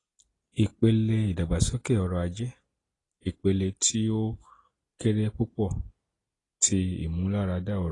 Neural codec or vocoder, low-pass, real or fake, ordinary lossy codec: none; 10.8 kHz; real; AAC, 32 kbps